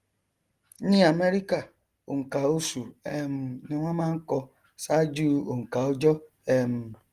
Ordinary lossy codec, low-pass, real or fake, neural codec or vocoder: Opus, 24 kbps; 14.4 kHz; real; none